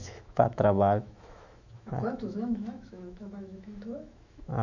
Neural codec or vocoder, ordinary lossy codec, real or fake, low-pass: autoencoder, 48 kHz, 128 numbers a frame, DAC-VAE, trained on Japanese speech; none; fake; 7.2 kHz